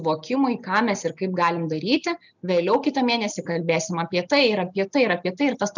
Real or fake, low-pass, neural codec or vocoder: real; 7.2 kHz; none